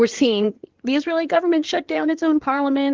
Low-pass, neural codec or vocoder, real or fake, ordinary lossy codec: 7.2 kHz; codec, 16 kHz in and 24 kHz out, 2.2 kbps, FireRedTTS-2 codec; fake; Opus, 16 kbps